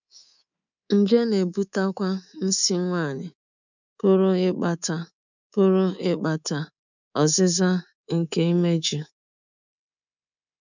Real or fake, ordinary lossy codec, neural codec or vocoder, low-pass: fake; none; codec, 24 kHz, 3.1 kbps, DualCodec; 7.2 kHz